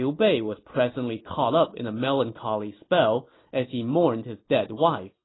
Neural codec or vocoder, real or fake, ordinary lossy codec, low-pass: none; real; AAC, 16 kbps; 7.2 kHz